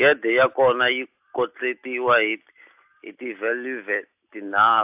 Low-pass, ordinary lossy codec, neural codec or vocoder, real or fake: 3.6 kHz; AAC, 32 kbps; none; real